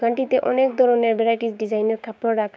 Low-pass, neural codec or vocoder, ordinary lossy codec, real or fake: none; codec, 16 kHz, 6 kbps, DAC; none; fake